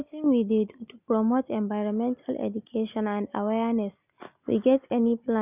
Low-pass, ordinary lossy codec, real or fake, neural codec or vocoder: 3.6 kHz; none; real; none